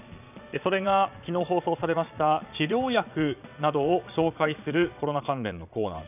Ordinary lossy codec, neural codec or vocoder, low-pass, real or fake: none; vocoder, 22.05 kHz, 80 mel bands, Vocos; 3.6 kHz; fake